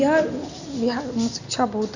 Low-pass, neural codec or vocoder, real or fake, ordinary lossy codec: 7.2 kHz; none; real; none